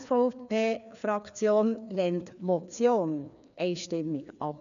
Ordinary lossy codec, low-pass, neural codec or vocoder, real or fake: none; 7.2 kHz; codec, 16 kHz, 2 kbps, FreqCodec, larger model; fake